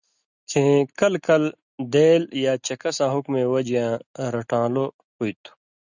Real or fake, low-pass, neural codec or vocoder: real; 7.2 kHz; none